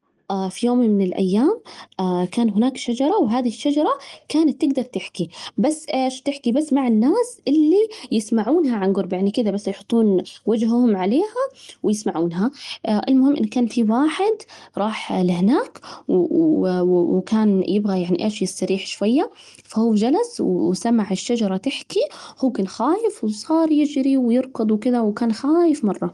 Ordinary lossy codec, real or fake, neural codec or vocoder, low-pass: Opus, 24 kbps; real; none; 10.8 kHz